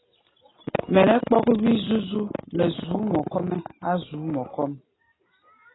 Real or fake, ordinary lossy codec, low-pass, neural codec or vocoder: real; AAC, 16 kbps; 7.2 kHz; none